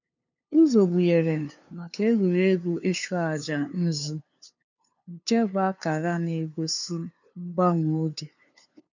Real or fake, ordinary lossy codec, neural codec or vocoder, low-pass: fake; none; codec, 16 kHz, 2 kbps, FunCodec, trained on LibriTTS, 25 frames a second; 7.2 kHz